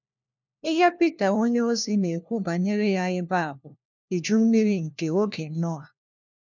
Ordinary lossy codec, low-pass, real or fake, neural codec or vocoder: none; 7.2 kHz; fake; codec, 16 kHz, 1 kbps, FunCodec, trained on LibriTTS, 50 frames a second